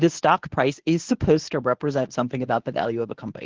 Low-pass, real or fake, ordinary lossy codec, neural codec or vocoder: 7.2 kHz; fake; Opus, 16 kbps; codec, 24 kHz, 0.9 kbps, WavTokenizer, medium speech release version 1